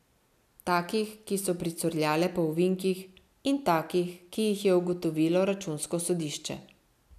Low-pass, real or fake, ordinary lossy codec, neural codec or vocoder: 14.4 kHz; real; none; none